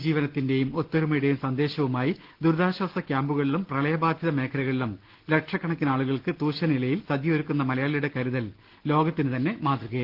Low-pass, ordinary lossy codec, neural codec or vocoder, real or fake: 5.4 kHz; Opus, 16 kbps; none; real